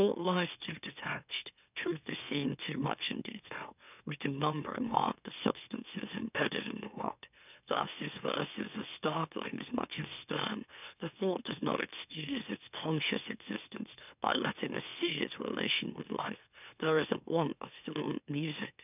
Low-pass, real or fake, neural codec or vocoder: 3.6 kHz; fake; autoencoder, 44.1 kHz, a latent of 192 numbers a frame, MeloTTS